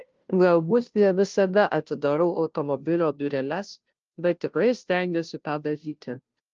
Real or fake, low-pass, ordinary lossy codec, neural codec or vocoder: fake; 7.2 kHz; Opus, 24 kbps; codec, 16 kHz, 0.5 kbps, FunCodec, trained on Chinese and English, 25 frames a second